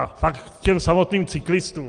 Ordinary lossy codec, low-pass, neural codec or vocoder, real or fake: Opus, 24 kbps; 9.9 kHz; none; real